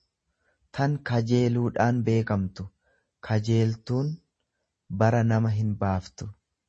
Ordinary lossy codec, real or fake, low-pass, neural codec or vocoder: MP3, 32 kbps; real; 10.8 kHz; none